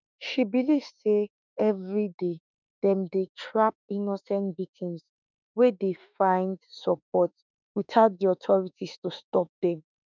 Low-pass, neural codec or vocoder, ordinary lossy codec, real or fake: 7.2 kHz; autoencoder, 48 kHz, 32 numbers a frame, DAC-VAE, trained on Japanese speech; none; fake